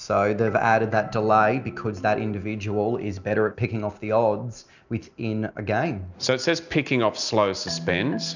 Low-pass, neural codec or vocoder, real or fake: 7.2 kHz; none; real